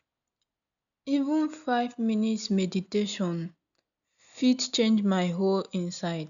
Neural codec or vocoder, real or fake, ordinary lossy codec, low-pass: none; real; none; 7.2 kHz